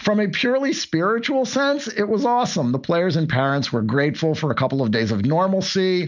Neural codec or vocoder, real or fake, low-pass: none; real; 7.2 kHz